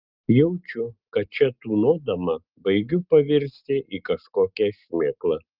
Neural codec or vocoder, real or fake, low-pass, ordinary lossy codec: none; real; 5.4 kHz; Opus, 32 kbps